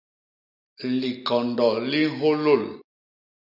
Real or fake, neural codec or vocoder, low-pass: real; none; 5.4 kHz